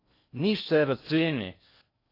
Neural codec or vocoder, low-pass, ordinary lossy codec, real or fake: codec, 16 kHz in and 24 kHz out, 0.6 kbps, FocalCodec, streaming, 4096 codes; 5.4 kHz; AAC, 32 kbps; fake